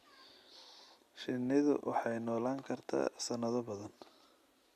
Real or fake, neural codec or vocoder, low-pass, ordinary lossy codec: real; none; 14.4 kHz; Opus, 64 kbps